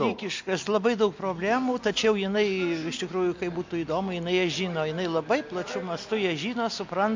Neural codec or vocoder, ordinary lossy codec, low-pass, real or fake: none; MP3, 48 kbps; 7.2 kHz; real